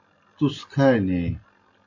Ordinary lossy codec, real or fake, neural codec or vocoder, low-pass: AAC, 48 kbps; real; none; 7.2 kHz